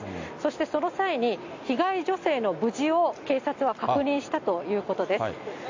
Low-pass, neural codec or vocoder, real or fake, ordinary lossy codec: 7.2 kHz; none; real; AAC, 48 kbps